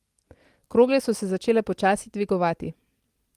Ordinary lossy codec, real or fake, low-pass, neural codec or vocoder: Opus, 24 kbps; real; 14.4 kHz; none